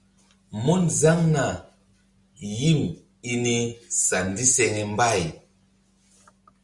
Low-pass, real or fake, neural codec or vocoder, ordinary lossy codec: 10.8 kHz; real; none; Opus, 64 kbps